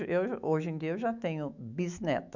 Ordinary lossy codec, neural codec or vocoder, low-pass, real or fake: none; autoencoder, 48 kHz, 128 numbers a frame, DAC-VAE, trained on Japanese speech; 7.2 kHz; fake